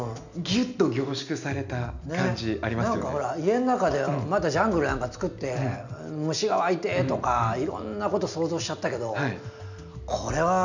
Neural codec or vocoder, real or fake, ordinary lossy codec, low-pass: none; real; none; 7.2 kHz